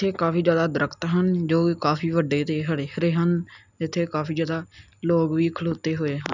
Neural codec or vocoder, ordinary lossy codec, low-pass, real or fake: none; none; 7.2 kHz; real